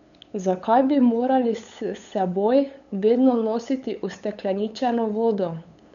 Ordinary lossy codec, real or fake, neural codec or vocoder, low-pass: none; fake; codec, 16 kHz, 8 kbps, FunCodec, trained on LibriTTS, 25 frames a second; 7.2 kHz